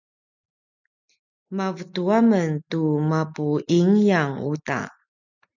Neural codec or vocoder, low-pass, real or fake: none; 7.2 kHz; real